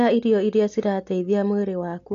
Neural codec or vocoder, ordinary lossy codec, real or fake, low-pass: none; AAC, 48 kbps; real; 7.2 kHz